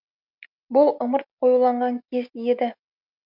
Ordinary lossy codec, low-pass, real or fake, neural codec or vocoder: MP3, 48 kbps; 5.4 kHz; real; none